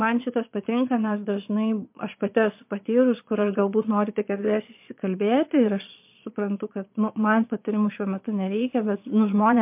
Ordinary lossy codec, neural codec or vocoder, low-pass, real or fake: MP3, 32 kbps; vocoder, 22.05 kHz, 80 mel bands, Vocos; 3.6 kHz; fake